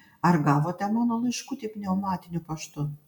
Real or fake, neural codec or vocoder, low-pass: real; none; 19.8 kHz